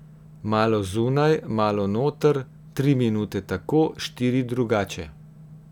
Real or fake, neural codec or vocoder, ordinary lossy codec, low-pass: real; none; none; 19.8 kHz